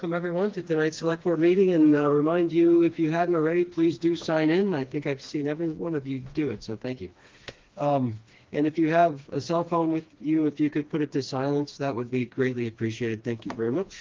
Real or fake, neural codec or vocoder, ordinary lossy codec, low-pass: fake; codec, 16 kHz, 2 kbps, FreqCodec, smaller model; Opus, 32 kbps; 7.2 kHz